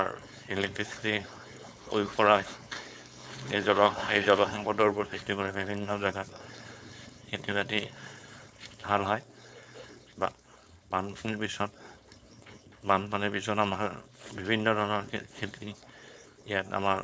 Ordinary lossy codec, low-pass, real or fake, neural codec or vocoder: none; none; fake; codec, 16 kHz, 4.8 kbps, FACodec